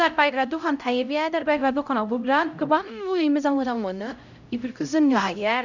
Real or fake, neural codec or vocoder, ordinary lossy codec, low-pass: fake; codec, 16 kHz, 0.5 kbps, X-Codec, HuBERT features, trained on LibriSpeech; none; 7.2 kHz